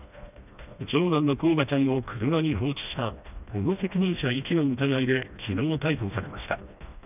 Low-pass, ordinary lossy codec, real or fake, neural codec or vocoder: 3.6 kHz; none; fake; codec, 16 kHz, 1 kbps, FreqCodec, smaller model